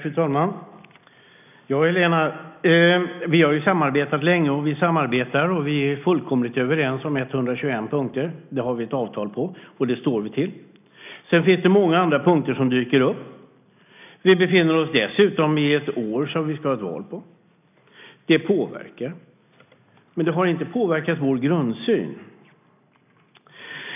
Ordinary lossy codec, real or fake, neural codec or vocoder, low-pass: none; real; none; 3.6 kHz